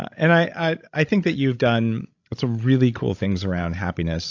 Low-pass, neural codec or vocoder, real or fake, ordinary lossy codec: 7.2 kHz; codec, 16 kHz, 16 kbps, FreqCodec, larger model; fake; AAC, 48 kbps